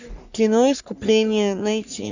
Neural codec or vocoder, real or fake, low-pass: codec, 44.1 kHz, 3.4 kbps, Pupu-Codec; fake; 7.2 kHz